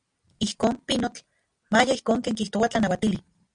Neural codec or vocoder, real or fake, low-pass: none; real; 9.9 kHz